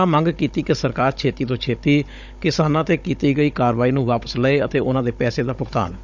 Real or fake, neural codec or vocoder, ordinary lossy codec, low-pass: fake; codec, 16 kHz, 16 kbps, FunCodec, trained on Chinese and English, 50 frames a second; none; 7.2 kHz